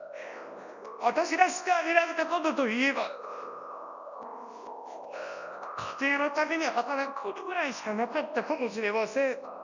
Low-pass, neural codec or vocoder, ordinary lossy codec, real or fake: 7.2 kHz; codec, 24 kHz, 0.9 kbps, WavTokenizer, large speech release; none; fake